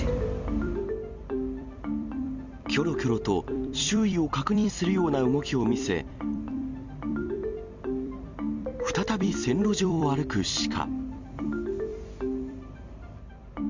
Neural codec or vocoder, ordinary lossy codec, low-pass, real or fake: vocoder, 44.1 kHz, 128 mel bands every 256 samples, BigVGAN v2; Opus, 64 kbps; 7.2 kHz; fake